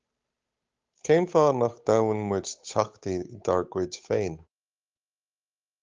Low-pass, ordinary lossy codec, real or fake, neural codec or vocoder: 7.2 kHz; Opus, 32 kbps; fake; codec, 16 kHz, 8 kbps, FunCodec, trained on Chinese and English, 25 frames a second